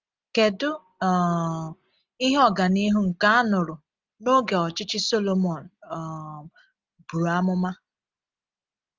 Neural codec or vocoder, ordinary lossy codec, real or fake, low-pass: none; Opus, 32 kbps; real; 7.2 kHz